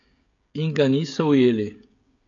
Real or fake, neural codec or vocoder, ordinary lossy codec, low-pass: fake; codec, 16 kHz, 16 kbps, FreqCodec, smaller model; AAC, 48 kbps; 7.2 kHz